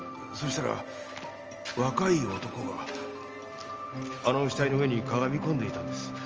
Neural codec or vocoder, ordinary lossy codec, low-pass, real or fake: none; Opus, 24 kbps; 7.2 kHz; real